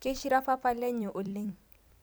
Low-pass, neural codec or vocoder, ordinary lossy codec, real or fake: none; vocoder, 44.1 kHz, 128 mel bands every 256 samples, BigVGAN v2; none; fake